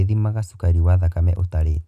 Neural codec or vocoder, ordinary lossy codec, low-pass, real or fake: none; none; 14.4 kHz; real